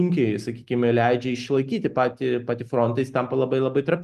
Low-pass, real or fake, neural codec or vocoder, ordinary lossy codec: 14.4 kHz; real; none; Opus, 24 kbps